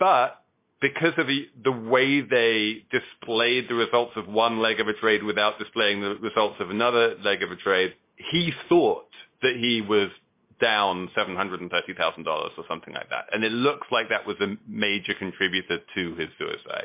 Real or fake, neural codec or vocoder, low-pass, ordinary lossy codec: real; none; 3.6 kHz; MP3, 24 kbps